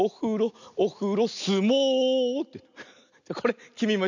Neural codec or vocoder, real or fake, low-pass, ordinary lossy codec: none; real; 7.2 kHz; none